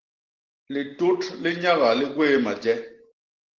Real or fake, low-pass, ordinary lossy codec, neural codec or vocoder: real; 7.2 kHz; Opus, 16 kbps; none